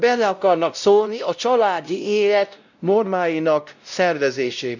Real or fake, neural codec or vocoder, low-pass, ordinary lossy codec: fake; codec, 16 kHz, 0.5 kbps, X-Codec, WavLM features, trained on Multilingual LibriSpeech; 7.2 kHz; none